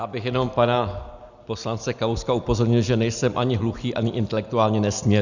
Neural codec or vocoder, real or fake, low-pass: none; real; 7.2 kHz